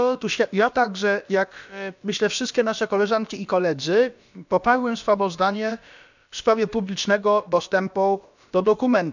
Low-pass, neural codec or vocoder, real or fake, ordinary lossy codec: 7.2 kHz; codec, 16 kHz, about 1 kbps, DyCAST, with the encoder's durations; fake; none